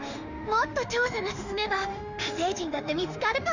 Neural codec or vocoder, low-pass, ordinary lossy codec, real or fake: autoencoder, 48 kHz, 32 numbers a frame, DAC-VAE, trained on Japanese speech; 7.2 kHz; none; fake